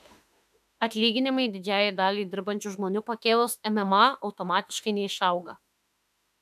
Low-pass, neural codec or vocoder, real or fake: 14.4 kHz; autoencoder, 48 kHz, 32 numbers a frame, DAC-VAE, trained on Japanese speech; fake